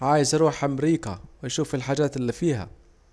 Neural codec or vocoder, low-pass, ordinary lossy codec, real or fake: none; none; none; real